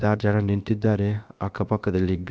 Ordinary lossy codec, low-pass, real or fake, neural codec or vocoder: none; none; fake; codec, 16 kHz, about 1 kbps, DyCAST, with the encoder's durations